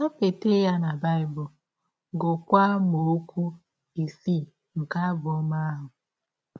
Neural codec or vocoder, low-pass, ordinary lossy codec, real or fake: none; none; none; real